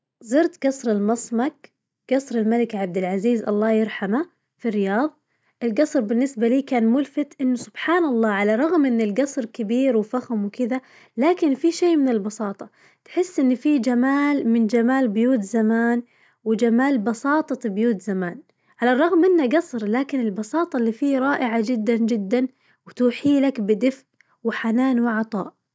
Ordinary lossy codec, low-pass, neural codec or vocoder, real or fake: none; none; none; real